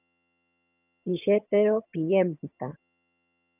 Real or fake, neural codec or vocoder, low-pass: fake; vocoder, 22.05 kHz, 80 mel bands, HiFi-GAN; 3.6 kHz